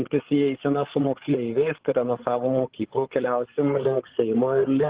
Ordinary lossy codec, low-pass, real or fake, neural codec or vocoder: Opus, 16 kbps; 3.6 kHz; fake; codec, 16 kHz, 8 kbps, FreqCodec, larger model